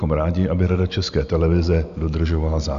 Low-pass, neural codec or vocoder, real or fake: 7.2 kHz; none; real